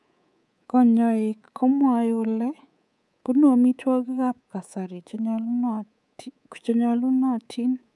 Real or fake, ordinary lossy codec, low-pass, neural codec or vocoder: fake; none; none; codec, 24 kHz, 3.1 kbps, DualCodec